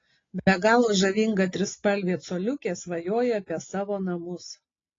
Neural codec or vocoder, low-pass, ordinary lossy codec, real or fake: none; 7.2 kHz; AAC, 32 kbps; real